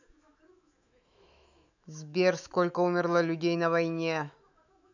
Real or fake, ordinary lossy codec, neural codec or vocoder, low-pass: fake; none; autoencoder, 48 kHz, 128 numbers a frame, DAC-VAE, trained on Japanese speech; 7.2 kHz